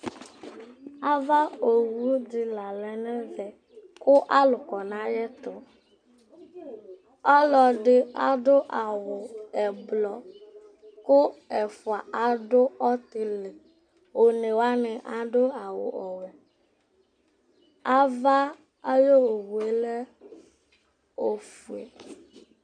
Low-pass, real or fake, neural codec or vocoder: 9.9 kHz; real; none